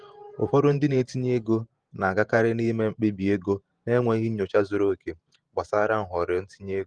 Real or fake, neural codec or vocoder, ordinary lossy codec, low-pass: fake; vocoder, 48 kHz, 128 mel bands, Vocos; Opus, 24 kbps; 9.9 kHz